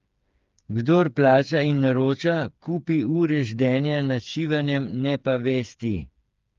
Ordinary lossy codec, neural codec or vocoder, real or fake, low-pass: Opus, 32 kbps; codec, 16 kHz, 4 kbps, FreqCodec, smaller model; fake; 7.2 kHz